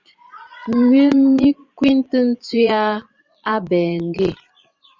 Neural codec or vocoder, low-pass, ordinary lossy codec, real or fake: vocoder, 22.05 kHz, 80 mel bands, Vocos; 7.2 kHz; Opus, 64 kbps; fake